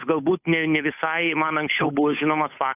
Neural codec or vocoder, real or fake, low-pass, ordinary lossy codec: codec, 16 kHz, 8 kbps, FunCodec, trained on Chinese and English, 25 frames a second; fake; 3.6 kHz; AAC, 24 kbps